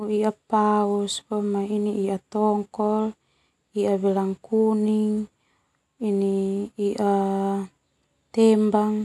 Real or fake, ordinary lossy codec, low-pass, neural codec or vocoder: real; none; none; none